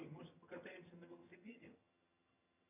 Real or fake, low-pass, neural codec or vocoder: fake; 3.6 kHz; vocoder, 22.05 kHz, 80 mel bands, HiFi-GAN